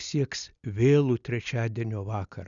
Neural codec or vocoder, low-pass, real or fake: none; 7.2 kHz; real